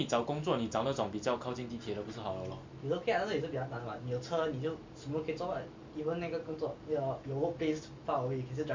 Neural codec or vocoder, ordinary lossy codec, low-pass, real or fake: none; MP3, 48 kbps; 7.2 kHz; real